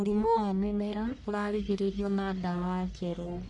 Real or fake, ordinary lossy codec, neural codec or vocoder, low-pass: fake; none; codec, 44.1 kHz, 1.7 kbps, Pupu-Codec; 10.8 kHz